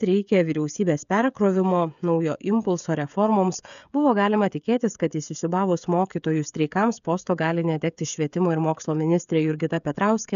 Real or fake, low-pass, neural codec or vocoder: fake; 7.2 kHz; codec, 16 kHz, 16 kbps, FreqCodec, smaller model